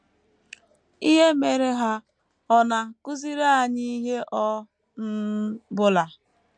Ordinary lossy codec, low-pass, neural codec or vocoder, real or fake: MP3, 64 kbps; 9.9 kHz; none; real